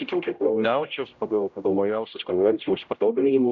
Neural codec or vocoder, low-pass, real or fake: codec, 16 kHz, 0.5 kbps, X-Codec, HuBERT features, trained on general audio; 7.2 kHz; fake